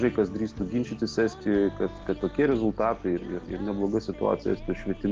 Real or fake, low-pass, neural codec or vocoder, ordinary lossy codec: real; 7.2 kHz; none; Opus, 16 kbps